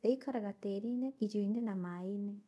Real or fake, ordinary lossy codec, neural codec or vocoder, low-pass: fake; none; codec, 24 kHz, 0.5 kbps, DualCodec; none